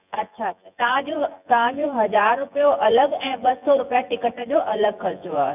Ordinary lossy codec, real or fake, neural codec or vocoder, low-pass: none; fake; vocoder, 24 kHz, 100 mel bands, Vocos; 3.6 kHz